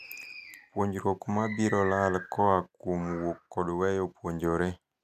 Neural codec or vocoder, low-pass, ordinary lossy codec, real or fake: none; 14.4 kHz; none; real